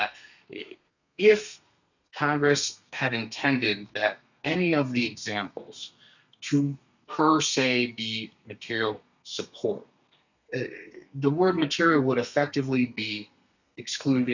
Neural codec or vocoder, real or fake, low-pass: codec, 32 kHz, 1.9 kbps, SNAC; fake; 7.2 kHz